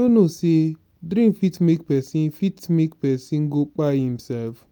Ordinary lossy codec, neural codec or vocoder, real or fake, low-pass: none; none; real; none